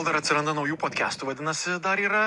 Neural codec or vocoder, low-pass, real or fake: none; 10.8 kHz; real